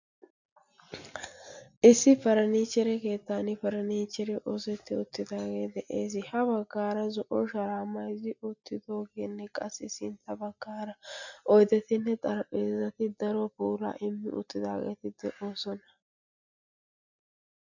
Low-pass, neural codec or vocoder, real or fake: 7.2 kHz; none; real